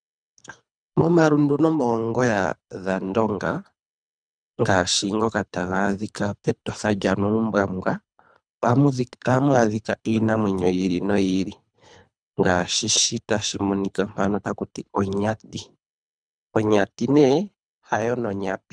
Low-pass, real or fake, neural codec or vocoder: 9.9 kHz; fake; codec, 24 kHz, 3 kbps, HILCodec